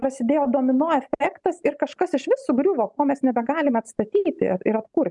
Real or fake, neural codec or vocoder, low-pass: real; none; 10.8 kHz